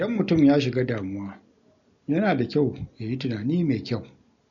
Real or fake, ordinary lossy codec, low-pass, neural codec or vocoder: real; MP3, 48 kbps; 7.2 kHz; none